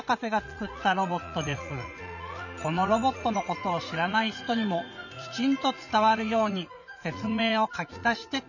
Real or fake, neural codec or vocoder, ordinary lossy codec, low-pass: fake; vocoder, 44.1 kHz, 80 mel bands, Vocos; none; 7.2 kHz